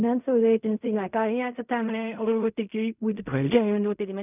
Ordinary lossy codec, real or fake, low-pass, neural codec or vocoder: none; fake; 3.6 kHz; codec, 16 kHz in and 24 kHz out, 0.4 kbps, LongCat-Audio-Codec, fine tuned four codebook decoder